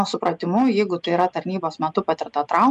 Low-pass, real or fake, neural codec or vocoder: 10.8 kHz; real; none